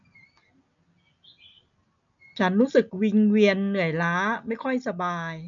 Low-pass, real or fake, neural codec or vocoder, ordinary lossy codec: 7.2 kHz; real; none; none